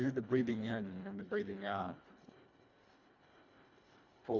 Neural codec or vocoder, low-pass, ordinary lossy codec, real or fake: codec, 24 kHz, 1.5 kbps, HILCodec; 7.2 kHz; MP3, 64 kbps; fake